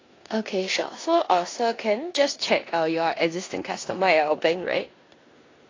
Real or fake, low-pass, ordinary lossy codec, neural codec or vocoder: fake; 7.2 kHz; AAC, 32 kbps; codec, 16 kHz in and 24 kHz out, 0.9 kbps, LongCat-Audio-Codec, four codebook decoder